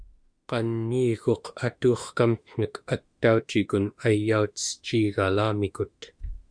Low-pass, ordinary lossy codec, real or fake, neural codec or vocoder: 9.9 kHz; Opus, 64 kbps; fake; autoencoder, 48 kHz, 32 numbers a frame, DAC-VAE, trained on Japanese speech